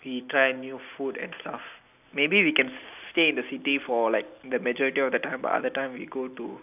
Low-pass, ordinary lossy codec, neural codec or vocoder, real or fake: 3.6 kHz; none; none; real